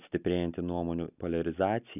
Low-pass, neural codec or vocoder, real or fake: 3.6 kHz; none; real